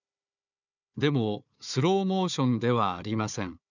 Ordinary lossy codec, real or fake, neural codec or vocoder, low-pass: none; fake; codec, 16 kHz, 4 kbps, FunCodec, trained on Chinese and English, 50 frames a second; 7.2 kHz